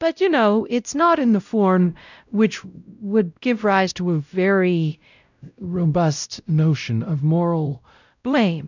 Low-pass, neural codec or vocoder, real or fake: 7.2 kHz; codec, 16 kHz, 0.5 kbps, X-Codec, WavLM features, trained on Multilingual LibriSpeech; fake